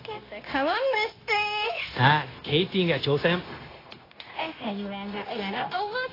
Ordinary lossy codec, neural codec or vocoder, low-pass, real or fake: AAC, 24 kbps; codec, 16 kHz, 0.9 kbps, LongCat-Audio-Codec; 5.4 kHz; fake